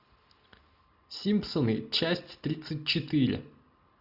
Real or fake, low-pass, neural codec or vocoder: real; 5.4 kHz; none